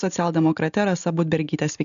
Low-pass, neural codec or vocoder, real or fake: 7.2 kHz; none; real